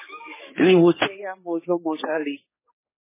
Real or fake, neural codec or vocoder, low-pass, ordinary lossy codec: fake; codec, 16 kHz, 4 kbps, X-Codec, HuBERT features, trained on general audio; 3.6 kHz; MP3, 16 kbps